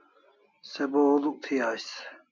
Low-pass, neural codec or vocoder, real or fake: 7.2 kHz; none; real